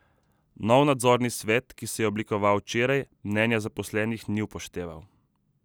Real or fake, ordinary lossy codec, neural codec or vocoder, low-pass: real; none; none; none